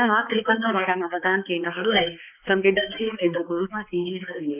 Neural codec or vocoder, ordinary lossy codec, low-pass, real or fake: codec, 16 kHz, 2 kbps, X-Codec, HuBERT features, trained on balanced general audio; none; 3.6 kHz; fake